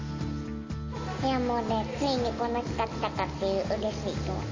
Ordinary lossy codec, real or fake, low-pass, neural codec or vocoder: MP3, 32 kbps; real; 7.2 kHz; none